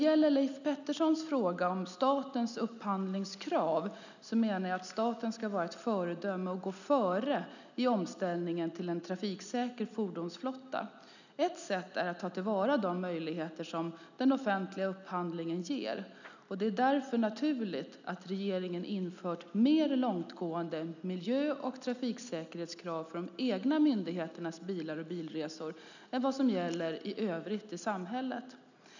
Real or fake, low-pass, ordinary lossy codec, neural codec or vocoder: real; 7.2 kHz; none; none